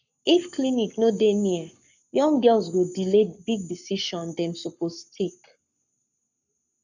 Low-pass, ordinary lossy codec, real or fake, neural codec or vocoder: 7.2 kHz; none; fake; codec, 44.1 kHz, 7.8 kbps, Pupu-Codec